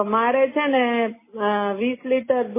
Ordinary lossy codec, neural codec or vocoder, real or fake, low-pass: MP3, 16 kbps; none; real; 3.6 kHz